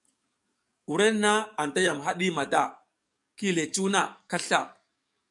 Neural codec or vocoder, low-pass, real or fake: codec, 44.1 kHz, 7.8 kbps, DAC; 10.8 kHz; fake